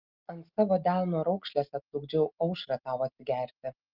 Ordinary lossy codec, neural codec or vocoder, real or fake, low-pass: Opus, 32 kbps; none; real; 5.4 kHz